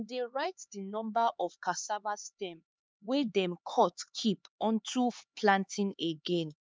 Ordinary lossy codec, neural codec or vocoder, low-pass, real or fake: none; codec, 16 kHz, 4 kbps, X-Codec, HuBERT features, trained on LibriSpeech; none; fake